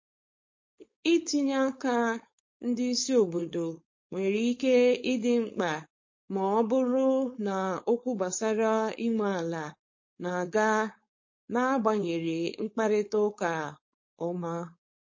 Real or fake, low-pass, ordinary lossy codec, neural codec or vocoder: fake; 7.2 kHz; MP3, 32 kbps; codec, 16 kHz, 4.8 kbps, FACodec